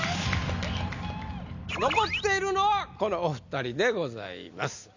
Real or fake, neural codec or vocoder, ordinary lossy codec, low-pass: real; none; none; 7.2 kHz